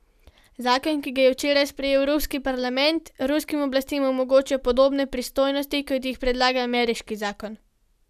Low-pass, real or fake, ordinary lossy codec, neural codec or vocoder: 14.4 kHz; real; none; none